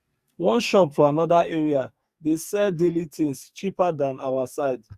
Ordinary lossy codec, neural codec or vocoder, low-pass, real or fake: Opus, 64 kbps; codec, 44.1 kHz, 2.6 kbps, SNAC; 14.4 kHz; fake